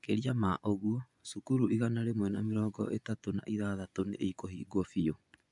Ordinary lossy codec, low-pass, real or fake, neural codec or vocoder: MP3, 96 kbps; 10.8 kHz; fake; vocoder, 24 kHz, 100 mel bands, Vocos